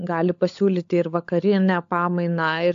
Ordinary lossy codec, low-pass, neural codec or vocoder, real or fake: AAC, 64 kbps; 7.2 kHz; codec, 16 kHz, 16 kbps, FunCodec, trained on LibriTTS, 50 frames a second; fake